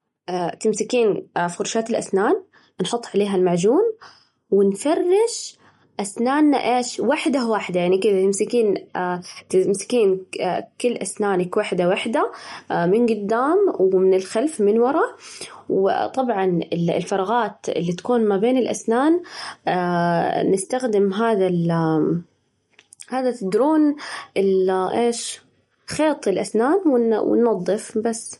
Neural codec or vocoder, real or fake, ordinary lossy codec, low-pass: none; real; MP3, 48 kbps; 19.8 kHz